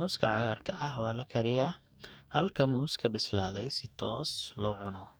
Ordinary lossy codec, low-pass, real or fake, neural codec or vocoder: none; none; fake; codec, 44.1 kHz, 2.6 kbps, DAC